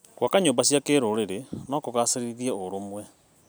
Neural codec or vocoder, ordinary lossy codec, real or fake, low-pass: none; none; real; none